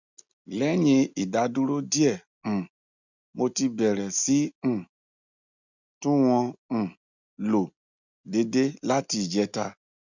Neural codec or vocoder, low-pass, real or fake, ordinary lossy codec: none; 7.2 kHz; real; none